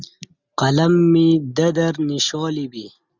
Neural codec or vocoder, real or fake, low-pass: none; real; 7.2 kHz